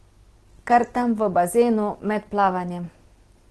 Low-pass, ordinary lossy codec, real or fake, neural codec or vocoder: 10.8 kHz; Opus, 16 kbps; real; none